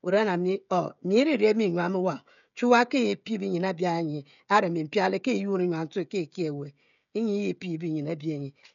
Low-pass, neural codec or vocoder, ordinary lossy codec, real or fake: 7.2 kHz; codec, 16 kHz, 16 kbps, FreqCodec, smaller model; none; fake